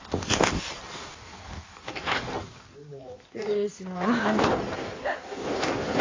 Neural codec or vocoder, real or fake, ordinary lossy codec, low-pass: codec, 16 kHz in and 24 kHz out, 1.1 kbps, FireRedTTS-2 codec; fake; AAC, 32 kbps; 7.2 kHz